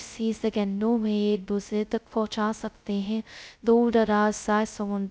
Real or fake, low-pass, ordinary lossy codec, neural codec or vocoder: fake; none; none; codec, 16 kHz, 0.2 kbps, FocalCodec